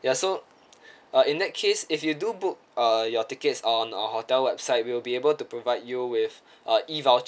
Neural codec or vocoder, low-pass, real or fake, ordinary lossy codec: none; none; real; none